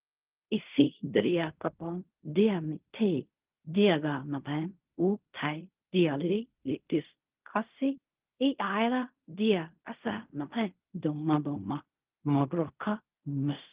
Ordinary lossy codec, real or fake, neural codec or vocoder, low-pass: Opus, 32 kbps; fake; codec, 16 kHz in and 24 kHz out, 0.4 kbps, LongCat-Audio-Codec, fine tuned four codebook decoder; 3.6 kHz